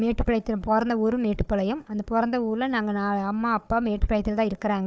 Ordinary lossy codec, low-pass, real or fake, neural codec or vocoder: none; none; fake; codec, 16 kHz, 4 kbps, FunCodec, trained on Chinese and English, 50 frames a second